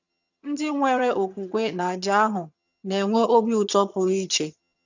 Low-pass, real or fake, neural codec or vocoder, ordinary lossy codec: 7.2 kHz; fake; vocoder, 22.05 kHz, 80 mel bands, HiFi-GAN; none